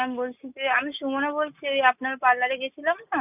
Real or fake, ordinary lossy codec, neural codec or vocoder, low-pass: real; none; none; 3.6 kHz